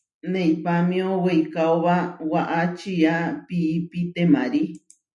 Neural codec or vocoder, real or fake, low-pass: none; real; 10.8 kHz